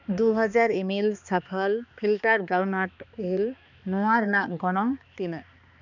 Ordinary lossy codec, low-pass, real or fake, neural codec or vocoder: none; 7.2 kHz; fake; codec, 16 kHz, 2 kbps, X-Codec, HuBERT features, trained on balanced general audio